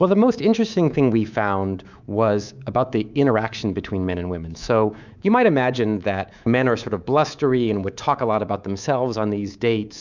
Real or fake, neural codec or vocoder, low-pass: fake; codec, 24 kHz, 3.1 kbps, DualCodec; 7.2 kHz